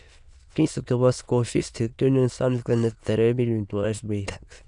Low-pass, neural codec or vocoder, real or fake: 9.9 kHz; autoencoder, 22.05 kHz, a latent of 192 numbers a frame, VITS, trained on many speakers; fake